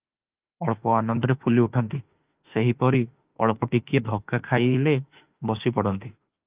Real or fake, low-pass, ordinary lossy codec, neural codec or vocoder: fake; 3.6 kHz; Opus, 24 kbps; autoencoder, 48 kHz, 32 numbers a frame, DAC-VAE, trained on Japanese speech